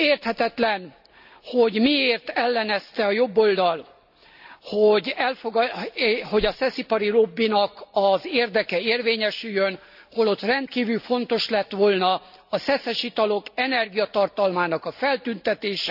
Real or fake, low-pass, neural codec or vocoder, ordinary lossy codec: real; 5.4 kHz; none; none